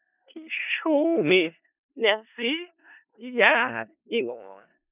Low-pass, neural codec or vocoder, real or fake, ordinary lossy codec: 3.6 kHz; codec, 16 kHz in and 24 kHz out, 0.4 kbps, LongCat-Audio-Codec, four codebook decoder; fake; none